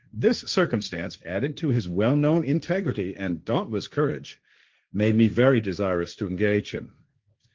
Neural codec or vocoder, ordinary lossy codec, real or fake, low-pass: codec, 16 kHz, 1.1 kbps, Voila-Tokenizer; Opus, 32 kbps; fake; 7.2 kHz